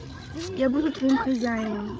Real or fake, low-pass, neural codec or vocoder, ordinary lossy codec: fake; none; codec, 16 kHz, 16 kbps, FreqCodec, larger model; none